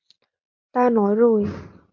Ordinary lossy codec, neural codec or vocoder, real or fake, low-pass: MP3, 48 kbps; none; real; 7.2 kHz